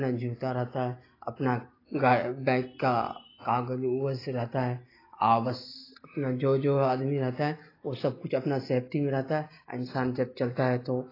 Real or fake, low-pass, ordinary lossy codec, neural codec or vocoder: real; 5.4 kHz; AAC, 24 kbps; none